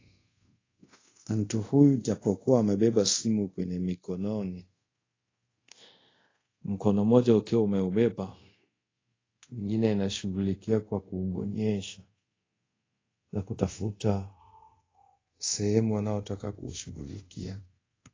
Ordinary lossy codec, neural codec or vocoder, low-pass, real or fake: AAC, 32 kbps; codec, 24 kHz, 0.5 kbps, DualCodec; 7.2 kHz; fake